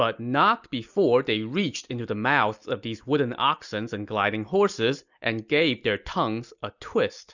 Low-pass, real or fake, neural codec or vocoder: 7.2 kHz; real; none